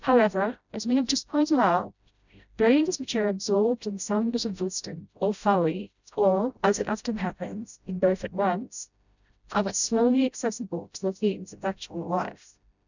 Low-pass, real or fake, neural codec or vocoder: 7.2 kHz; fake; codec, 16 kHz, 0.5 kbps, FreqCodec, smaller model